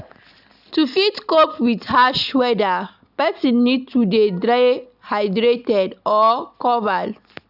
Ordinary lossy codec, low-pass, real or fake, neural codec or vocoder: none; 5.4 kHz; real; none